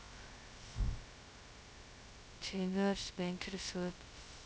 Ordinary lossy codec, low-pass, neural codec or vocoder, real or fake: none; none; codec, 16 kHz, 0.2 kbps, FocalCodec; fake